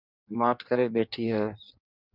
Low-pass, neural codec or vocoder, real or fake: 5.4 kHz; codec, 16 kHz in and 24 kHz out, 1.1 kbps, FireRedTTS-2 codec; fake